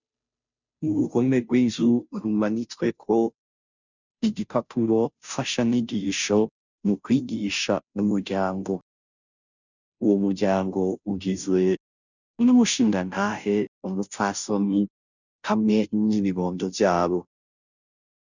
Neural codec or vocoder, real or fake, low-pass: codec, 16 kHz, 0.5 kbps, FunCodec, trained on Chinese and English, 25 frames a second; fake; 7.2 kHz